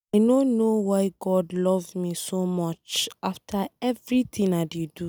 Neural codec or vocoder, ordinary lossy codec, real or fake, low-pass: none; none; real; none